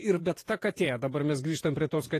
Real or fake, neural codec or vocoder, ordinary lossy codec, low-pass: fake; vocoder, 48 kHz, 128 mel bands, Vocos; AAC, 48 kbps; 14.4 kHz